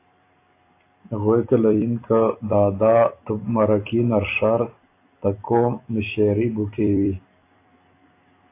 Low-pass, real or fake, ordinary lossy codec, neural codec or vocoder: 3.6 kHz; fake; AAC, 24 kbps; vocoder, 44.1 kHz, 128 mel bands every 256 samples, BigVGAN v2